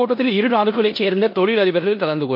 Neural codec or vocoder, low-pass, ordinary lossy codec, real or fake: codec, 16 kHz in and 24 kHz out, 0.9 kbps, LongCat-Audio-Codec, four codebook decoder; 5.4 kHz; MP3, 32 kbps; fake